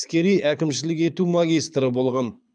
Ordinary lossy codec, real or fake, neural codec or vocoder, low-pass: none; fake; codec, 24 kHz, 6 kbps, HILCodec; 9.9 kHz